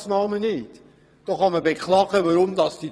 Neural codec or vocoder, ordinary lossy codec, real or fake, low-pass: vocoder, 22.05 kHz, 80 mel bands, WaveNeXt; none; fake; none